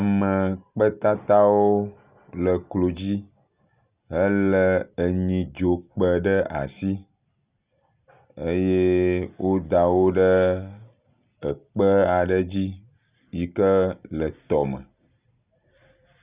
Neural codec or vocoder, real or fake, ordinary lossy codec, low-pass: none; real; Opus, 64 kbps; 3.6 kHz